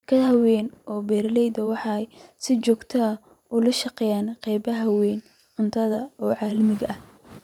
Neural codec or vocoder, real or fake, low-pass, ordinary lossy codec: vocoder, 44.1 kHz, 128 mel bands every 512 samples, BigVGAN v2; fake; 19.8 kHz; none